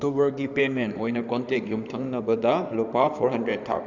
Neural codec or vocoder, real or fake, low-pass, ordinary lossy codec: codec, 16 kHz in and 24 kHz out, 2.2 kbps, FireRedTTS-2 codec; fake; 7.2 kHz; none